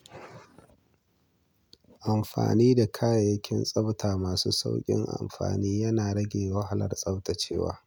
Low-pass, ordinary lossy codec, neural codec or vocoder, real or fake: none; none; none; real